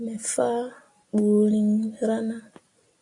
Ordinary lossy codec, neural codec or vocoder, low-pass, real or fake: AAC, 48 kbps; none; 10.8 kHz; real